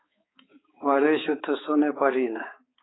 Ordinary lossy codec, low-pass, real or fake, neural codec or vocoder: AAC, 16 kbps; 7.2 kHz; fake; codec, 16 kHz, 4 kbps, X-Codec, HuBERT features, trained on balanced general audio